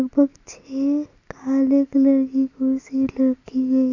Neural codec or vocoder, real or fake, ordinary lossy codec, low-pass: none; real; none; 7.2 kHz